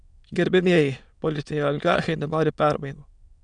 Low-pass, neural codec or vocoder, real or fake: 9.9 kHz; autoencoder, 22.05 kHz, a latent of 192 numbers a frame, VITS, trained on many speakers; fake